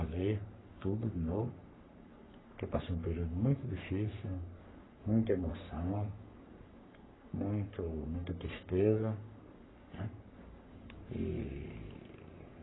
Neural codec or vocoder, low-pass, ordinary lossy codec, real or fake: codec, 44.1 kHz, 3.4 kbps, Pupu-Codec; 7.2 kHz; AAC, 16 kbps; fake